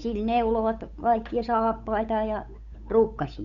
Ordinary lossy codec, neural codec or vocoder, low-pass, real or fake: none; codec, 16 kHz, 8 kbps, FunCodec, trained on Chinese and English, 25 frames a second; 7.2 kHz; fake